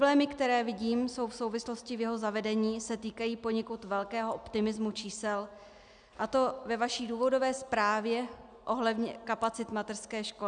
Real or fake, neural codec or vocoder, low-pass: real; none; 9.9 kHz